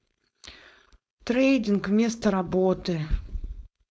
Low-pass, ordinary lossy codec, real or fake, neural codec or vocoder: none; none; fake; codec, 16 kHz, 4.8 kbps, FACodec